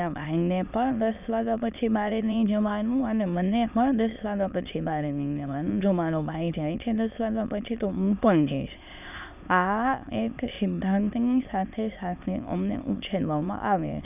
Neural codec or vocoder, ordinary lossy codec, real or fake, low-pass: autoencoder, 22.05 kHz, a latent of 192 numbers a frame, VITS, trained on many speakers; none; fake; 3.6 kHz